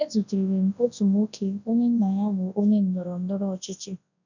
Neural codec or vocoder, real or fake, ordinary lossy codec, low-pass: codec, 24 kHz, 0.9 kbps, WavTokenizer, large speech release; fake; none; 7.2 kHz